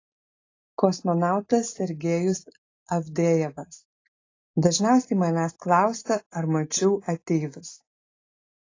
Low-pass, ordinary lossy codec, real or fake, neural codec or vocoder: 7.2 kHz; AAC, 32 kbps; real; none